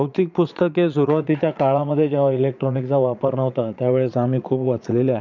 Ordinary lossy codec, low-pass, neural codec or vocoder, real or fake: none; 7.2 kHz; vocoder, 22.05 kHz, 80 mel bands, Vocos; fake